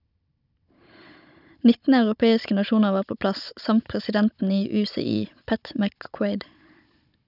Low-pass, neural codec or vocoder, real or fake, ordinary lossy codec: 5.4 kHz; codec, 16 kHz, 16 kbps, FunCodec, trained on Chinese and English, 50 frames a second; fake; none